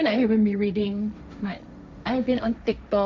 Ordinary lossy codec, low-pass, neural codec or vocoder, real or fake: none; none; codec, 16 kHz, 1.1 kbps, Voila-Tokenizer; fake